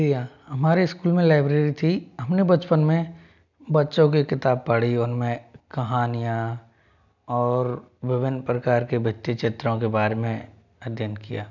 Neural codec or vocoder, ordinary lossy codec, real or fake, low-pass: none; none; real; 7.2 kHz